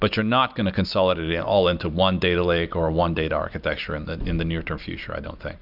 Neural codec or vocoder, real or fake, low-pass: none; real; 5.4 kHz